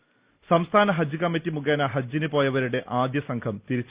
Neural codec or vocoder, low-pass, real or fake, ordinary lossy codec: none; 3.6 kHz; real; Opus, 64 kbps